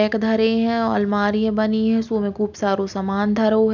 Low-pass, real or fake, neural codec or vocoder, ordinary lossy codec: 7.2 kHz; real; none; none